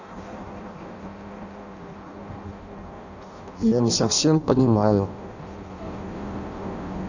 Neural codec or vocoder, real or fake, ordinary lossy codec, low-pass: codec, 16 kHz in and 24 kHz out, 0.6 kbps, FireRedTTS-2 codec; fake; none; 7.2 kHz